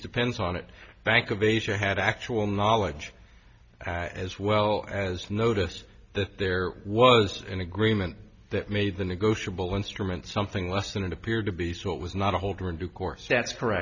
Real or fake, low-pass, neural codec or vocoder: real; 7.2 kHz; none